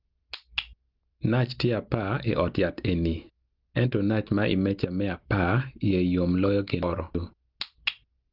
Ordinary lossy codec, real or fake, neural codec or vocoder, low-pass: Opus, 32 kbps; real; none; 5.4 kHz